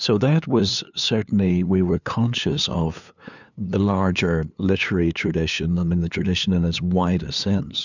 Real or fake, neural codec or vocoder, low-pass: fake; codec, 16 kHz, 2 kbps, FunCodec, trained on LibriTTS, 25 frames a second; 7.2 kHz